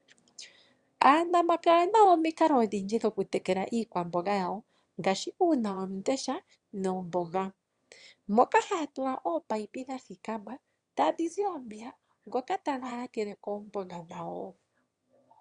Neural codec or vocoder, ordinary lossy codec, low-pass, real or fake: autoencoder, 22.05 kHz, a latent of 192 numbers a frame, VITS, trained on one speaker; Opus, 64 kbps; 9.9 kHz; fake